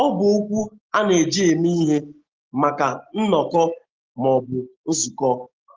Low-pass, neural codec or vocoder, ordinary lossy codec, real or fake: 7.2 kHz; none; Opus, 16 kbps; real